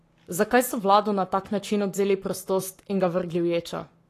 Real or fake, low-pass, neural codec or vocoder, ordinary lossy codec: fake; 14.4 kHz; codec, 44.1 kHz, 7.8 kbps, Pupu-Codec; AAC, 48 kbps